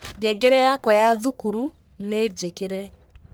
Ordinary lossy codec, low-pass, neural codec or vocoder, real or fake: none; none; codec, 44.1 kHz, 1.7 kbps, Pupu-Codec; fake